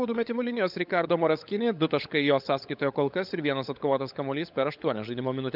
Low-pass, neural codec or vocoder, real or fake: 5.4 kHz; codec, 16 kHz, 16 kbps, FunCodec, trained on LibriTTS, 50 frames a second; fake